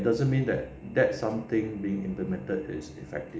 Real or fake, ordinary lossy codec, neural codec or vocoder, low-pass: real; none; none; none